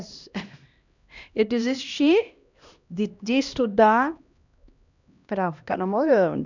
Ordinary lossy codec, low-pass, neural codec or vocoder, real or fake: none; 7.2 kHz; codec, 16 kHz, 1 kbps, X-Codec, HuBERT features, trained on LibriSpeech; fake